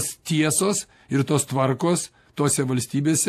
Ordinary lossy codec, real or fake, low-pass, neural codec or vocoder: AAC, 64 kbps; real; 14.4 kHz; none